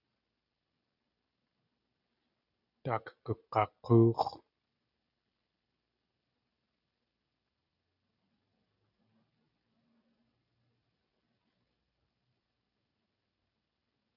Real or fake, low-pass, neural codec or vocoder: real; 5.4 kHz; none